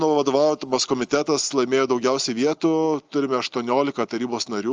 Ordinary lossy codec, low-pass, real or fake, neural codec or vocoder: Opus, 24 kbps; 7.2 kHz; real; none